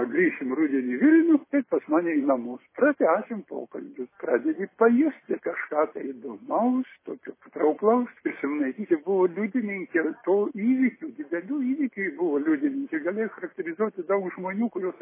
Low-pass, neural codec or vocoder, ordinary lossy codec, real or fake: 3.6 kHz; none; MP3, 16 kbps; real